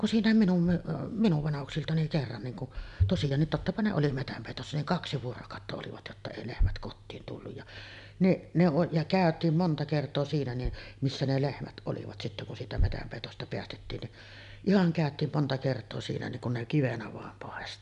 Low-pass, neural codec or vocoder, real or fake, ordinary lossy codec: 10.8 kHz; none; real; none